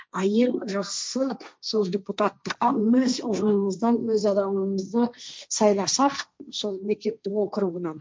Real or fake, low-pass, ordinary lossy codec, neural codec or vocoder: fake; none; none; codec, 16 kHz, 1.1 kbps, Voila-Tokenizer